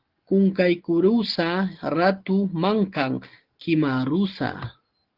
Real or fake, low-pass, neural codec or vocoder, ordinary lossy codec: real; 5.4 kHz; none; Opus, 16 kbps